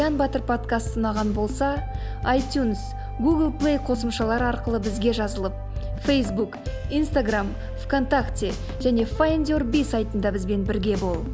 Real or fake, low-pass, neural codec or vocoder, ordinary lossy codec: real; none; none; none